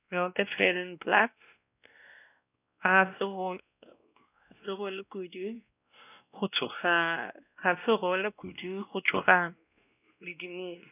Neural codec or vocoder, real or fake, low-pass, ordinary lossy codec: codec, 16 kHz, 1 kbps, X-Codec, WavLM features, trained on Multilingual LibriSpeech; fake; 3.6 kHz; none